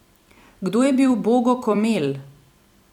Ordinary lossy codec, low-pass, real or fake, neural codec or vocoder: none; 19.8 kHz; fake; vocoder, 44.1 kHz, 128 mel bands every 256 samples, BigVGAN v2